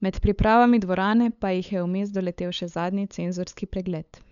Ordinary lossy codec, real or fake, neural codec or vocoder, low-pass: none; fake; codec, 16 kHz, 8 kbps, FunCodec, trained on LibriTTS, 25 frames a second; 7.2 kHz